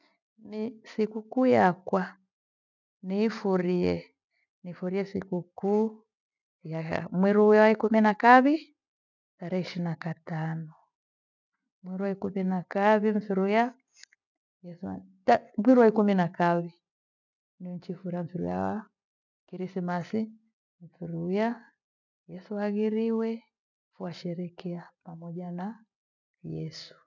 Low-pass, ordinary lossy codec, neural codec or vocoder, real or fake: 7.2 kHz; none; none; real